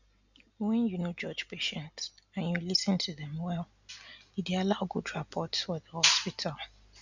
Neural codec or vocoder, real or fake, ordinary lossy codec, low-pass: none; real; none; 7.2 kHz